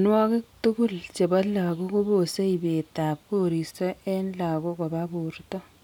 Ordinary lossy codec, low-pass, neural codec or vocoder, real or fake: none; 19.8 kHz; none; real